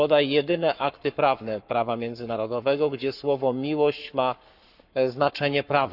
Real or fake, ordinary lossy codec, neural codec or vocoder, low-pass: fake; none; codec, 44.1 kHz, 7.8 kbps, Pupu-Codec; 5.4 kHz